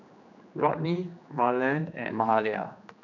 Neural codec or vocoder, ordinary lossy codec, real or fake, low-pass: codec, 16 kHz, 2 kbps, X-Codec, HuBERT features, trained on general audio; none; fake; 7.2 kHz